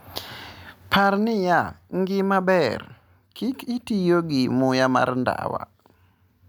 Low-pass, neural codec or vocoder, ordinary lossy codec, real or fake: none; none; none; real